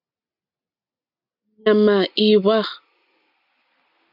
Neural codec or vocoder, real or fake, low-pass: none; real; 5.4 kHz